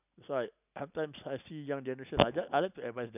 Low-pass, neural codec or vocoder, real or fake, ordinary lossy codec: 3.6 kHz; none; real; none